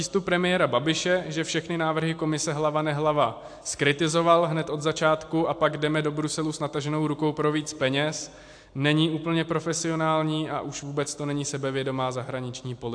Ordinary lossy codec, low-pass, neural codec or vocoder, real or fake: AAC, 64 kbps; 9.9 kHz; none; real